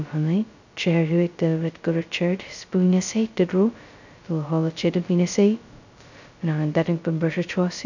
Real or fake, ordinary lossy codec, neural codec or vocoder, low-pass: fake; none; codec, 16 kHz, 0.2 kbps, FocalCodec; 7.2 kHz